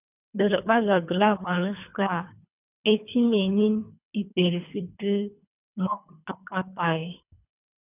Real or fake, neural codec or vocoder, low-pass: fake; codec, 24 kHz, 3 kbps, HILCodec; 3.6 kHz